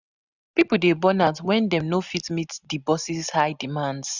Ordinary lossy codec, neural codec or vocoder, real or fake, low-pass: none; none; real; 7.2 kHz